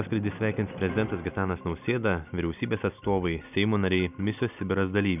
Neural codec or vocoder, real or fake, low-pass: none; real; 3.6 kHz